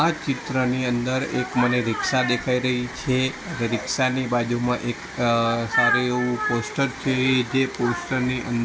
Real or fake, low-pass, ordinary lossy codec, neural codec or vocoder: real; none; none; none